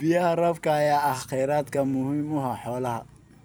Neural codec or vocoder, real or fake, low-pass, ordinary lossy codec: vocoder, 44.1 kHz, 128 mel bands every 256 samples, BigVGAN v2; fake; none; none